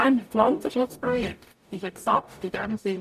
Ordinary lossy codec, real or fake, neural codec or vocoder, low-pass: none; fake; codec, 44.1 kHz, 0.9 kbps, DAC; 14.4 kHz